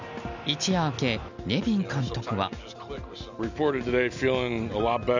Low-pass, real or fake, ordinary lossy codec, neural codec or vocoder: 7.2 kHz; real; none; none